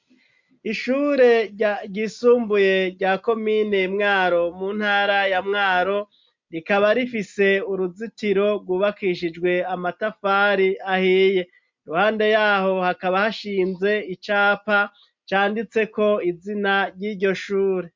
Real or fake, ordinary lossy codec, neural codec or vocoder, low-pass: real; MP3, 64 kbps; none; 7.2 kHz